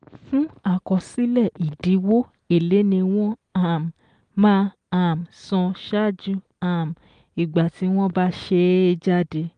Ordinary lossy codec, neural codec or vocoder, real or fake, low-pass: Opus, 24 kbps; none; real; 9.9 kHz